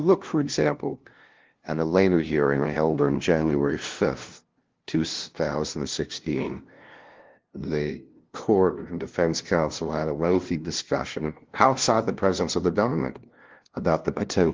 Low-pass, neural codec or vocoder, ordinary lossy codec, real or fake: 7.2 kHz; codec, 16 kHz, 0.5 kbps, FunCodec, trained on LibriTTS, 25 frames a second; Opus, 16 kbps; fake